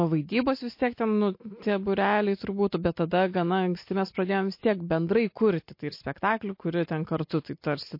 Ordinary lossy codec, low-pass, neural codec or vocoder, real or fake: MP3, 32 kbps; 5.4 kHz; none; real